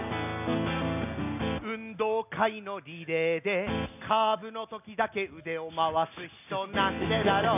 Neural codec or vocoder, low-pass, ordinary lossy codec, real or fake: none; 3.6 kHz; none; real